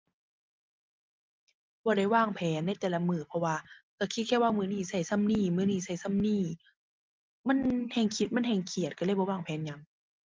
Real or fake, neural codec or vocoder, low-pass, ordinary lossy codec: real; none; 7.2 kHz; Opus, 32 kbps